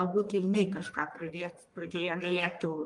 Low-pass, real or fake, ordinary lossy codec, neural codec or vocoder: 10.8 kHz; fake; Opus, 32 kbps; codec, 44.1 kHz, 1.7 kbps, Pupu-Codec